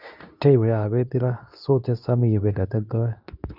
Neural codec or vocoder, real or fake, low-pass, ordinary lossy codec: codec, 24 kHz, 0.9 kbps, WavTokenizer, medium speech release version 2; fake; 5.4 kHz; none